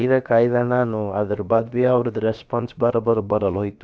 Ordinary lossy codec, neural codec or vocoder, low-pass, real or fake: none; codec, 16 kHz, about 1 kbps, DyCAST, with the encoder's durations; none; fake